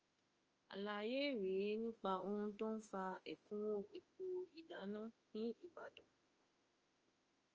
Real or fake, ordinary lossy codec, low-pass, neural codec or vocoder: fake; Opus, 24 kbps; 7.2 kHz; autoencoder, 48 kHz, 32 numbers a frame, DAC-VAE, trained on Japanese speech